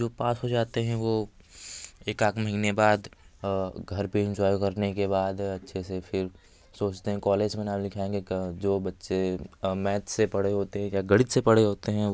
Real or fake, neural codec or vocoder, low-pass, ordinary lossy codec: real; none; none; none